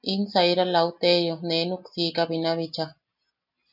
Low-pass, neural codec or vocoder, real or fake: 5.4 kHz; none; real